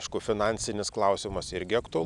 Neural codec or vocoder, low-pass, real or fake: none; 10.8 kHz; real